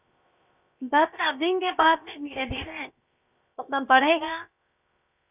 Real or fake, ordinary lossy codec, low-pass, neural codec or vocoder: fake; none; 3.6 kHz; codec, 16 kHz, 0.7 kbps, FocalCodec